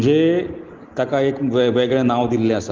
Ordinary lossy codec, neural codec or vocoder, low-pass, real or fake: Opus, 16 kbps; none; 7.2 kHz; real